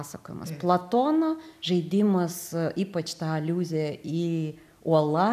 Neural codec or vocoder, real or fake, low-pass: none; real; 14.4 kHz